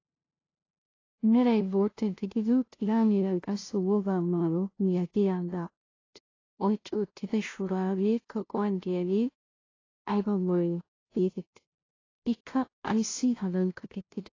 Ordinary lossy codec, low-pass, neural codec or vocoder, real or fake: AAC, 32 kbps; 7.2 kHz; codec, 16 kHz, 0.5 kbps, FunCodec, trained on LibriTTS, 25 frames a second; fake